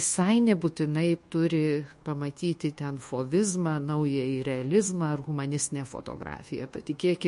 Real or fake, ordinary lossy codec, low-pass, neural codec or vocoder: fake; MP3, 48 kbps; 10.8 kHz; codec, 24 kHz, 1.2 kbps, DualCodec